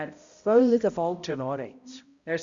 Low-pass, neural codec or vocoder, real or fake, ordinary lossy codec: 7.2 kHz; codec, 16 kHz, 0.5 kbps, X-Codec, HuBERT features, trained on balanced general audio; fake; Opus, 64 kbps